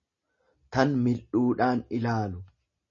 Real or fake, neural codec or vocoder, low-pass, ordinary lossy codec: real; none; 7.2 kHz; MP3, 32 kbps